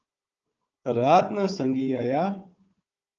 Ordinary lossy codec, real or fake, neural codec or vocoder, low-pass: Opus, 24 kbps; fake; codec, 16 kHz, 4 kbps, FunCodec, trained on Chinese and English, 50 frames a second; 7.2 kHz